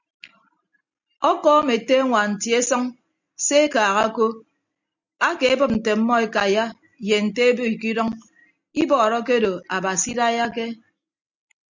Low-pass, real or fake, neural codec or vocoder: 7.2 kHz; real; none